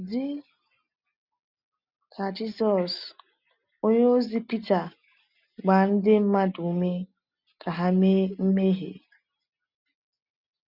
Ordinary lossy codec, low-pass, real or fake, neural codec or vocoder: none; 5.4 kHz; real; none